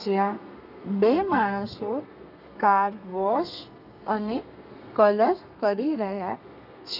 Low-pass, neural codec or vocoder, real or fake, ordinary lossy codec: 5.4 kHz; codec, 32 kHz, 1.9 kbps, SNAC; fake; none